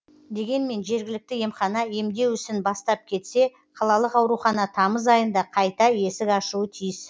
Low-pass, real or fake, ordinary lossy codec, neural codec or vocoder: none; real; none; none